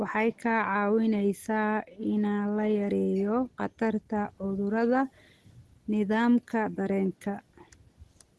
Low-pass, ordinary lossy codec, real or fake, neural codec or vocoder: 10.8 kHz; Opus, 16 kbps; real; none